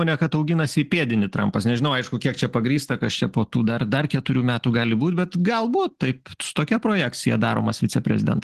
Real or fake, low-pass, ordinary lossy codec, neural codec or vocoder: real; 14.4 kHz; Opus, 16 kbps; none